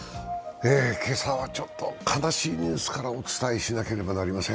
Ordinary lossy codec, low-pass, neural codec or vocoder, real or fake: none; none; none; real